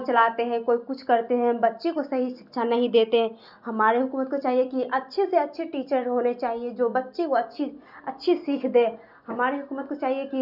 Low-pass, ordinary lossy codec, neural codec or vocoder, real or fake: 5.4 kHz; none; none; real